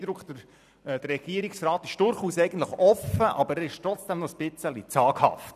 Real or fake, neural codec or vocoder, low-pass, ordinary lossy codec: fake; vocoder, 44.1 kHz, 128 mel bands every 256 samples, BigVGAN v2; 14.4 kHz; none